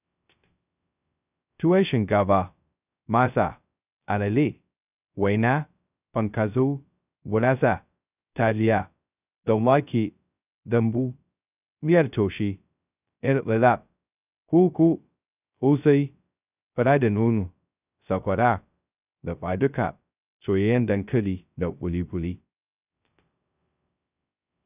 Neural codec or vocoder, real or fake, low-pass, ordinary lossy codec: codec, 16 kHz, 0.2 kbps, FocalCodec; fake; 3.6 kHz; none